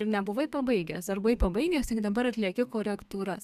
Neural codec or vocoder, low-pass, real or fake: codec, 32 kHz, 1.9 kbps, SNAC; 14.4 kHz; fake